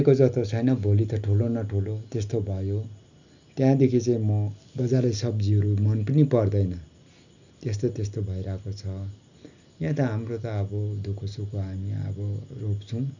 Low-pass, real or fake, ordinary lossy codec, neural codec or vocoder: 7.2 kHz; real; none; none